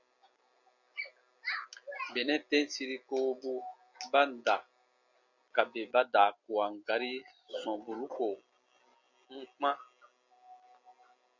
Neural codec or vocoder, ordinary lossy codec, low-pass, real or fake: none; MP3, 64 kbps; 7.2 kHz; real